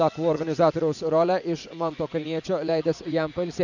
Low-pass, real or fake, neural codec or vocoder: 7.2 kHz; fake; vocoder, 44.1 kHz, 80 mel bands, Vocos